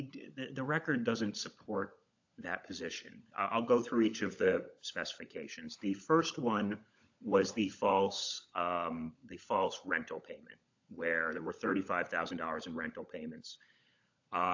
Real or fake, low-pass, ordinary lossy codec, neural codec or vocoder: fake; 7.2 kHz; AAC, 48 kbps; codec, 16 kHz, 16 kbps, FunCodec, trained on LibriTTS, 50 frames a second